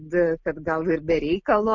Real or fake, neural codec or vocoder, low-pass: real; none; 7.2 kHz